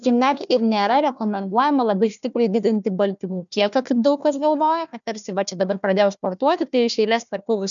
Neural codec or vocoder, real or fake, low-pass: codec, 16 kHz, 1 kbps, FunCodec, trained on Chinese and English, 50 frames a second; fake; 7.2 kHz